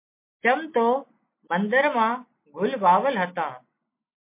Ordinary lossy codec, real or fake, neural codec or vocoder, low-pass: MP3, 24 kbps; real; none; 3.6 kHz